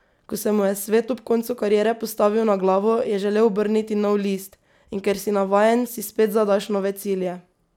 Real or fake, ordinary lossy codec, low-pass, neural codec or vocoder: real; none; 19.8 kHz; none